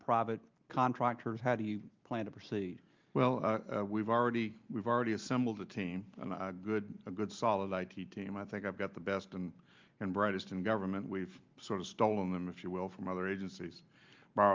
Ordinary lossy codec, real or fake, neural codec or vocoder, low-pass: Opus, 24 kbps; real; none; 7.2 kHz